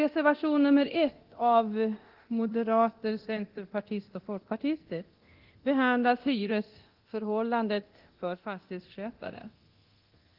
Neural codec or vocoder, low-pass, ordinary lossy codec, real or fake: codec, 24 kHz, 0.9 kbps, DualCodec; 5.4 kHz; Opus, 16 kbps; fake